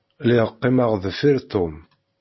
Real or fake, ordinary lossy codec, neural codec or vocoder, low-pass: real; MP3, 24 kbps; none; 7.2 kHz